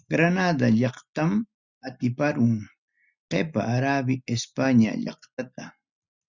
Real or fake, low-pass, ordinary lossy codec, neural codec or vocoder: real; 7.2 kHz; Opus, 64 kbps; none